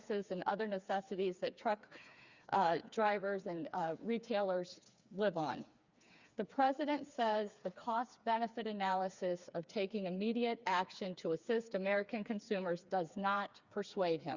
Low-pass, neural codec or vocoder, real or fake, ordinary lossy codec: 7.2 kHz; codec, 16 kHz, 4 kbps, FreqCodec, smaller model; fake; Opus, 64 kbps